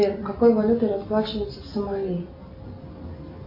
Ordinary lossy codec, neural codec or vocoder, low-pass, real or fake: AAC, 32 kbps; none; 5.4 kHz; real